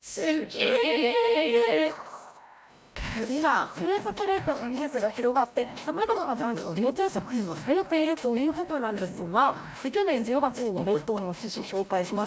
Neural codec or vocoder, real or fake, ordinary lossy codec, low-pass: codec, 16 kHz, 0.5 kbps, FreqCodec, larger model; fake; none; none